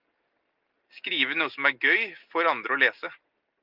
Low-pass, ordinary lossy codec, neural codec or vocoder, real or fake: 5.4 kHz; Opus, 32 kbps; none; real